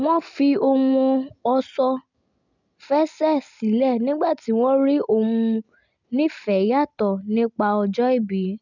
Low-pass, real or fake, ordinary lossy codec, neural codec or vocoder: 7.2 kHz; real; none; none